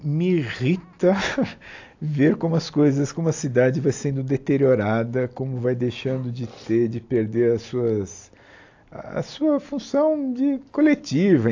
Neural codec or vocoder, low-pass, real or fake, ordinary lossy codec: none; 7.2 kHz; real; AAC, 48 kbps